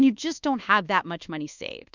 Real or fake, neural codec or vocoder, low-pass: fake; codec, 24 kHz, 1.2 kbps, DualCodec; 7.2 kHz